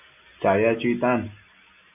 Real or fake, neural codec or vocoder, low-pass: real; none; 3.6 kHz